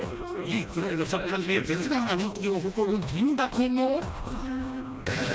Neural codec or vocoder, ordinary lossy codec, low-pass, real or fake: codec, 16 kHz, 1 kbps, FreqCodec, smaller model; none; none; fake